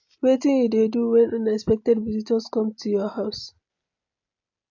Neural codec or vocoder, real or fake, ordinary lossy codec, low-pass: none; real; AAC, 48 kbps; 7.2 kHz